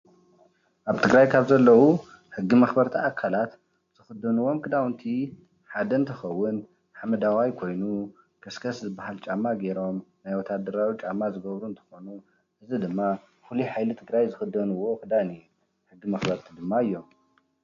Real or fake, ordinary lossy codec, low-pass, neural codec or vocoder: real; AAC, 64 kbps; 7.2 kHz; none